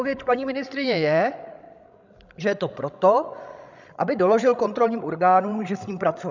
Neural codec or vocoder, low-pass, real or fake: codec, 16 kHz, 16 kbps, FreqCodec, larger model; 7.2 kHz; fake